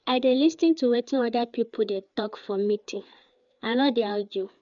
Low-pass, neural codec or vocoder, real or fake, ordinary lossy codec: 7.2 kHz; codec, 16 kHz, 4 kbps, FreqCodec, larger model; fake; none